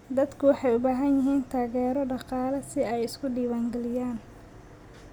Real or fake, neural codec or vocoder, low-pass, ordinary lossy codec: real; none; 19.8 kHz; none